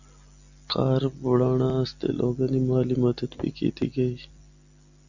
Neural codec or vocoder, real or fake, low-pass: none; real; 7.2 kHz